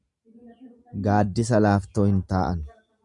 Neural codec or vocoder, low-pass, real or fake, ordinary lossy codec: none; 10.8 kHz; real; MP3, 96 kbps